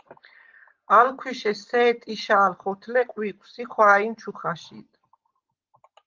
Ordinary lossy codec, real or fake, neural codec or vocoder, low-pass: Opus, 32 kbps; real; none; 7.2 kHz